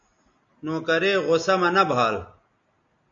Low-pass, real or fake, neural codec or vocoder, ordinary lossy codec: 7.2 kHz; real; none; MP3, 48 kbps